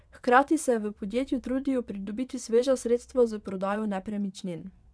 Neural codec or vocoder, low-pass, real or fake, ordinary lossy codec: vocoder, 22.05 kHz, 80 mel bands, WaveNeXt; none; fake; none